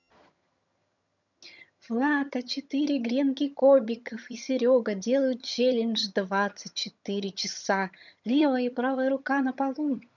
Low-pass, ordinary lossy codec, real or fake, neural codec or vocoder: 7.2 kHz; none; fake; vocoder, 22.05 kHz, 80 mel bands, HiFi-GAN